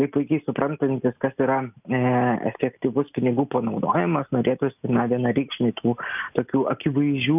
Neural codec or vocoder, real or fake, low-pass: none; real; 3.6 kHz